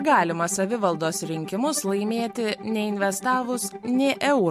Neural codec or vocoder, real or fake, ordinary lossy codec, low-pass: none; real; MP3, 64 kbps; 14.4 kHz